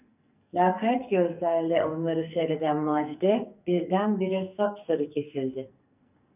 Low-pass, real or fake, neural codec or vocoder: 3.6 kHz; fake; codec, 44.1 kHz, 2.6 kbps, SNAC